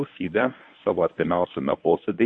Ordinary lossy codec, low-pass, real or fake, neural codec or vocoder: MP3, 48 kbps; 9.9 kHz; fake; codec, 24 kHz, 0.9 kbps, WavTokenizer, medium speech release version 1